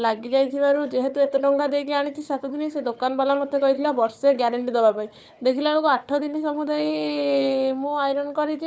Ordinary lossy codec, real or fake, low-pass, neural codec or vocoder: none; fake; none; codec, 16 kHz, 4 kbps, FunCodec, trained on Chinese and English, 50 frames a second